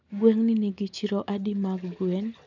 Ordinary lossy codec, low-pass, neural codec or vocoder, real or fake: none; 7.2 kHz; none; real